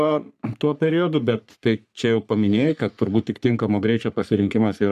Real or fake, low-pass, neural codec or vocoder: fake; 14.4 kHz; codec, 44.1 kHz, 3.4 kbps, Pupu-Codec